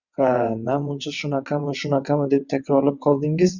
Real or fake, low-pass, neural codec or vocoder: fake; 7.2 kHz; vocoder, 22.05 kHz, 80 mel bands, WaveNeXt